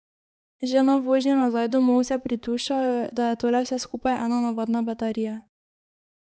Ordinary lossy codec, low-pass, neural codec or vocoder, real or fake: none; none; codec, 16 kHz, 4 kbps, X-Codec, HuBERT features, trained on balanced general audio; fake